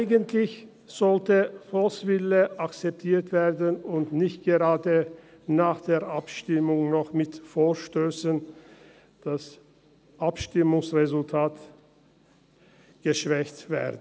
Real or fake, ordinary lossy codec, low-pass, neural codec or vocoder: real; none; none; none